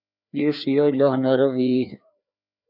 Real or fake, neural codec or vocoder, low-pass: fake; codec, 16 kHz, 2 kbps, FreqCodec, larger model; 5.4 kHz